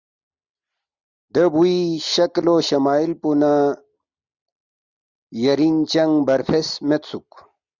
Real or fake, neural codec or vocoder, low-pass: real; none; 7.2 kHz